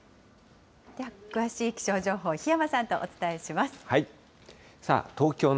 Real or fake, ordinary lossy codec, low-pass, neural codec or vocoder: real; none; none; none